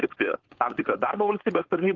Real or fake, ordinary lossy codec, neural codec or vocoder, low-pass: fake; Opus, 16 kbps; codec, 16 kHz, 4.8 kbps, FACodec; 7.2 kHz